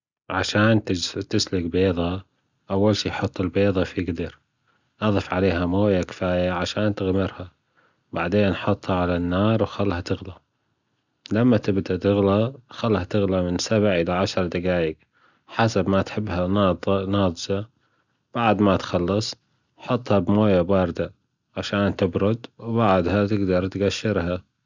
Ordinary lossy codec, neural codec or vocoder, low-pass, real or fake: Opus, 64 kbps; none; 7.2 kHz; real